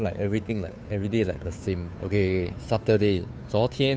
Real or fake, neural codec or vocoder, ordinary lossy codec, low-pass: fake; codec, 16 kHz, 2 kbps, FunCodec, trained on Chinese and English, 25 frames a second; none; none